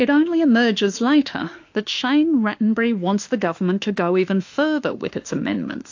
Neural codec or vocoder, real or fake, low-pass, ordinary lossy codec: autoencoder, 48 kHz, 32 numbers a frame, DAC-VAE, trained on Japanese speech; fake; 7.2 kHz; AAC, 48 kbps